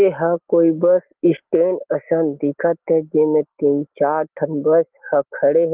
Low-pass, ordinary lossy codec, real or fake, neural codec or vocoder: 3.6 kHz; Opus, 16 kbps; real; none